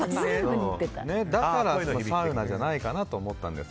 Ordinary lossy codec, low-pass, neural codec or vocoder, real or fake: none; none; none; real